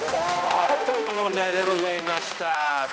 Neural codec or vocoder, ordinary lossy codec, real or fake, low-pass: codec, 16 kHz, 1 kbps, X-Codec, HuBERT features, trained on balanced general audio; none; fake; none